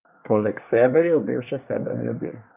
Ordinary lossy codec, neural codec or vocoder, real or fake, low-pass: none; codec, 24 kHz, 1 kbps, SNAC; fake; 3.6 kHz